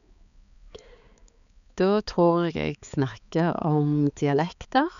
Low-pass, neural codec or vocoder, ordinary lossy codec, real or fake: 7.2 kHz; codec, 16 kHz, 4 kbps, X-Codec, HuBERT features, trained on balanced general audio; none; fake